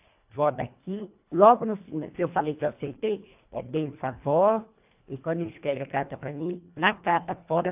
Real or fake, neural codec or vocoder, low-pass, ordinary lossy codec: fake; codec, 24 kHz, 1.5 kbps, HILCodec; 3.6 kHz; AAC, 32 kbps